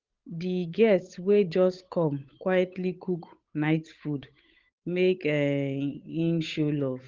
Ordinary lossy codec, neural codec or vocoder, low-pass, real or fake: Opus, 32 kbps; codec, 16 kHz, 8 kbps, FunCodec, trained on Chinese and English, 25 frames a second; 7.2 kHz; fake